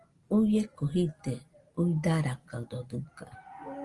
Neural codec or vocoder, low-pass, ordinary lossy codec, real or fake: none; 10.8 kHz; Opus, 24 kbps; real